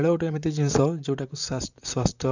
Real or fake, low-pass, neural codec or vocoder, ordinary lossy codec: fake; 7.2 kHz; vocoder, 44.1 kHz, 128 mel bands, Pupu-Vocoder; none